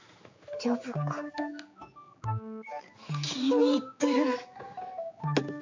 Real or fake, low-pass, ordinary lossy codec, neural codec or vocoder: fake; 7.2 kHz; MP3, 64 kbps; codec, 16 kHz, 4 kbps, X-Codec, HuBERT features, trained on general audio